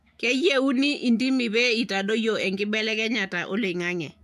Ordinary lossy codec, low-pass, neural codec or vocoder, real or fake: AAC, 96 kbps; 14.4 kHz; autoencoder, 48 kHz, 128 numbers a frame, DAC-VAE, trained on Japanese speech; fake